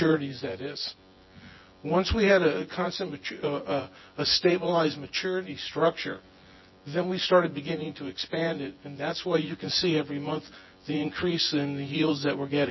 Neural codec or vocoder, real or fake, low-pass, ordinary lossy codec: vocoder, 24 kHz, 100 mel bands, Vocos; fake; 7.2 kHz; MP3, 24 kbps